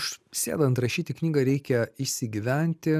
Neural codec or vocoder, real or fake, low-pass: none; real; 14.4 kHz